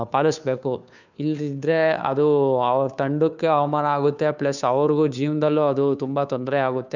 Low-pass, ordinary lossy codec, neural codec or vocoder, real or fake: 7.2 kHz; none; codec, 16 kHz, 2 kbps, FunCodec, trained on Chinese and English, 25 frames a second; fake